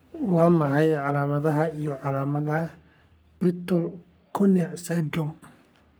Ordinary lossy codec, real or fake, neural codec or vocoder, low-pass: none; fake; codec, 44.1 kHz, 3.4 kbps, Pupu-Codec; none